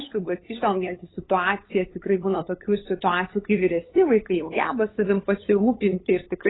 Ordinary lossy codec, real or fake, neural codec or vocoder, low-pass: AAC, 16 kbps; fake; codec, 16 kHz, 8 kbps, FunCodec, trained on LibriTTS, 25 frames a second; 7.2 kHz